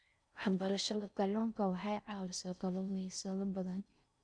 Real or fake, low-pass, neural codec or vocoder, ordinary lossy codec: fake; 9.9 kHz; codec, 16 kHz in and 24 kHz out, 0.6 kbps, FocalCodec, streaming, 4096 codes; none